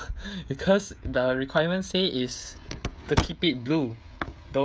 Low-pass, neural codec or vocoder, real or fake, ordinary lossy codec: none; codec, 16 kHz, 16 kbps, FreqCodec, smaller model; fake; none